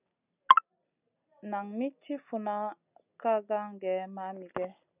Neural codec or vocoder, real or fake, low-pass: none; real; 3.6 kHz